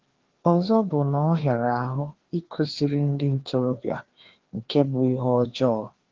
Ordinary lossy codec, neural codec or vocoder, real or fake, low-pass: Opus, 16 kbps; codec, 16 kHz, 2 kbps, FreqCodec, larger model; fake; 7.2 kHz